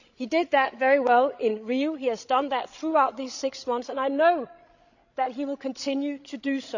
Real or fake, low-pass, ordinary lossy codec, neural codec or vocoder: fake; 7.2 kHz; none; codec, 16 kHz, 16 kbps, FreqCodec, larger model